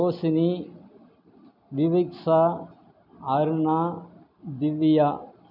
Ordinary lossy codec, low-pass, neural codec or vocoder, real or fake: none; 5.4 kHz; none; real